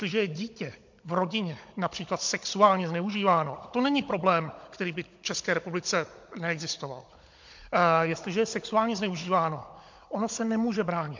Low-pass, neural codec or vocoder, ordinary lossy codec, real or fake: 7.2 kHz; codec, 16 kHz, 16 kbps, FunCodec, trained on Chinese and English, 50 frames a second; MP3, 48 kbps; fake